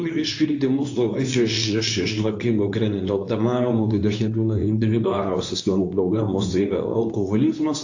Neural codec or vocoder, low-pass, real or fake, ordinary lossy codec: codec, 24 kHz, 0.9 kbps, WavTokenizer, medium speech release version 2; 7.2 kHz; fake; AAC, 48 kbps